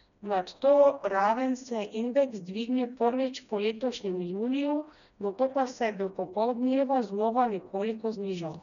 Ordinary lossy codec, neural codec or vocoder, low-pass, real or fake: none; codec, 16 kHz, 1 kbps, FreqCodec, smaller model; 7.2 kHz; fake